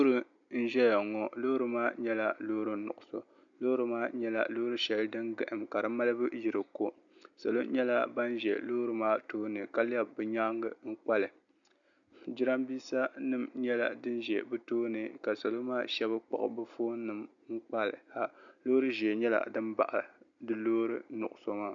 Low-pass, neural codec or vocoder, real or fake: 7.2 kHz; none; real